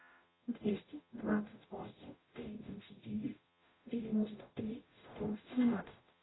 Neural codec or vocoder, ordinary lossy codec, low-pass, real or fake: codec, 44.1 kHz, 0.9 kbps, DAC; AAC, 16 kbps; 7.2 kHz; fake